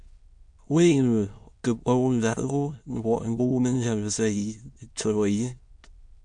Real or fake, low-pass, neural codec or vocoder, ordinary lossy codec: fake; 9.9 kHz; autoencoder, 22.05 kHz, a latent of 192 numbers a frame, VITS, trained on many speakers; MP3, 64 kbps